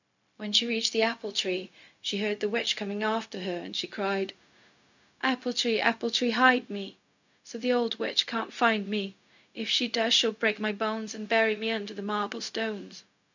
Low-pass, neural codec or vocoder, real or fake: 7.2 kHz; codec, 16 kHz, 0.4 kbps, LongCat-Audio-Codec; fake